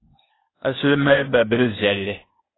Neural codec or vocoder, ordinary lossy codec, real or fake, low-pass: codec, 16 kHz, 0.8 kbps, ZipCodec; AAC, 16 kbps; fake; 7.2 kHz